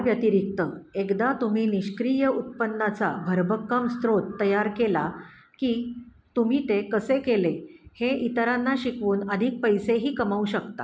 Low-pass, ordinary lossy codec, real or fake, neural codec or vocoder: none; none; real; none